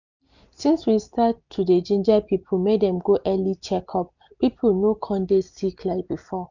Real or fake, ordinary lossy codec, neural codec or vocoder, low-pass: real; none; none; 7.2 kHz